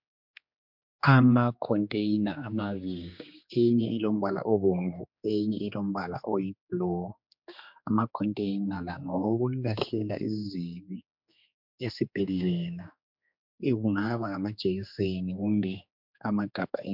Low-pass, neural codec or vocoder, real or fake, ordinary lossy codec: 5.4 kHz; codec, 16 kHz, 2 kbps, X-Codec, HuBERT features, trained on general audio; fake; MP3, 48 kbps